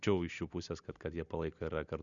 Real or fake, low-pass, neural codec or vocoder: real; 7.2 kHz; none